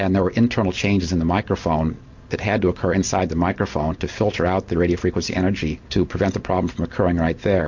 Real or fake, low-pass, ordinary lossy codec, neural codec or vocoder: real; 7.2 kHz; MP3, 48 kbps; none